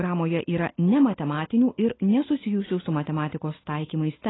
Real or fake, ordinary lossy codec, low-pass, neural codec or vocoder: real; AAC, 16 kbps; 7.2 kHz; none